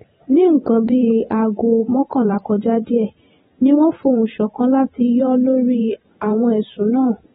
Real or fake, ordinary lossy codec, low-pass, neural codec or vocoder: fake; AAC, 16 kbps; 19.8 kHz; vocoder, 44.1 kHz, 128 mel bands every 512 samples, BigVGAN v2